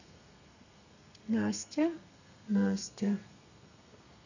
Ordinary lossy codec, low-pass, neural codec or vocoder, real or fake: none; 7.2 kHz; codec, 32 kHz, 1.9 kbps, SNAC; fake